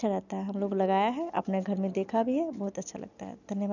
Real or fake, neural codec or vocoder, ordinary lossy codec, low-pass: real; none; none; 7.2 kHz